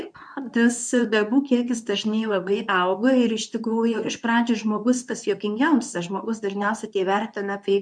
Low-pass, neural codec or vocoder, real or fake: 9.9 kHz; codec, 24 kHz, 0.9 kbps, WavTokenizer, medium speech release version 2; fake